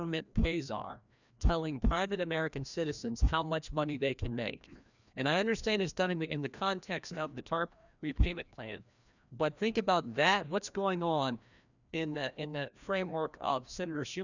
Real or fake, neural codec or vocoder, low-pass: fake; codec, 16 kHz, 1 kbps, FreqCodec, larger model; 7.2 kHz